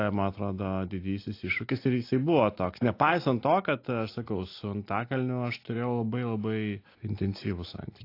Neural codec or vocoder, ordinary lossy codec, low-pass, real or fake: none; AAC, 32 kbps; 5.4 kHz; real